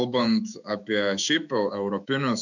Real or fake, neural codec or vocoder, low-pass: fake; autoencoder, 48 kHz, 128 numbers a frame, DAC-VAE, trained on Japanese speech; 7.2 kHz